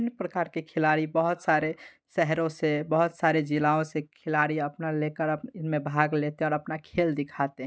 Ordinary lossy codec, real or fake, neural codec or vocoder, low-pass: none; real; none; none